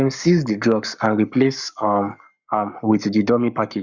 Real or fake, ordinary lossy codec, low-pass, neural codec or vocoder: fake; none; 7.2 kHz; codec, 44.1 kHz, 7.8 kbps, Pupu-Codec